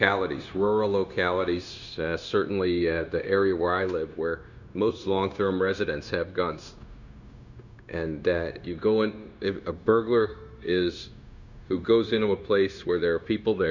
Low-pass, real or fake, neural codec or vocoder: 7.2 kHz; fake; codec, 16 kHz, 0.9 kbps, LongCat-Audio-Codec